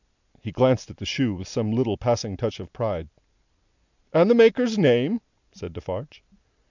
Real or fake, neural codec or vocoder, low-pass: real; none; 7.2 kHz